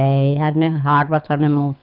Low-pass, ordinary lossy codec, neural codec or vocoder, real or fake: 5.4 kHz; none; codec, 24 kHz, 6 kbps, HILCodec; fake